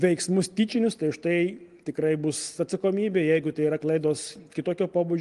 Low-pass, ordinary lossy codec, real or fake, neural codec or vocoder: 10.8 kHz; Opus, 24 kbps; real; none